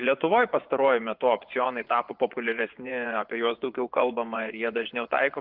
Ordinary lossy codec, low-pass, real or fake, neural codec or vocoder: Opus, 24 kbps; 5.4 kHz; fake; vocoder, 24 kHz, 100 mel bands, Vocos